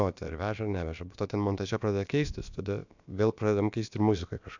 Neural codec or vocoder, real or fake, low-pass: codec, 16 kHz, about 1 kbps, DyCAST, with the encoder's durations; fake; 7.2 kHz